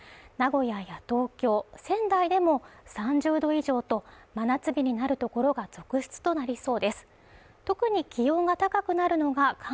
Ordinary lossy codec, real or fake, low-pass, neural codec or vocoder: none; real; none; none